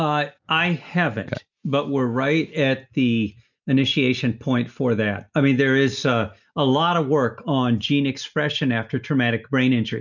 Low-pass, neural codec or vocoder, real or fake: 7.2 kHz; none; real